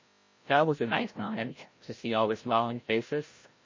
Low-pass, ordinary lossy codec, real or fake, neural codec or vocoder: 7.2 kHz; MP3, 32 kbps; fake; codec, 16 kHz, 0.5 kbps, FreqCodec, larger model